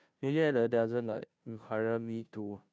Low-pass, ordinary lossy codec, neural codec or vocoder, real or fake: none; none; codec, 16 kHz, 0.5 kbps, FunCodec, trained on Chinese and English, 25 frames a second; fake